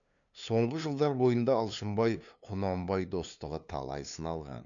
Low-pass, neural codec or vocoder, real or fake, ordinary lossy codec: 7.2 kHz; codec, 16 kHz, 2 kbps, FunCodec, trained on LibriTTS, 25 frames a second; fake; none